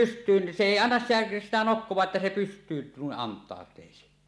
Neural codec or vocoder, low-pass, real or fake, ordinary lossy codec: none; none; real; none